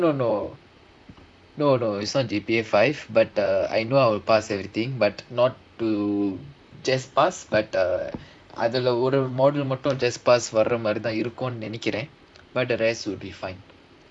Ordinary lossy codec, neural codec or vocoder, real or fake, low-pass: none; vocoder, 44.1 kHz, 128 mel bands, Pupu-Vocoder; fake; 9.9 kHz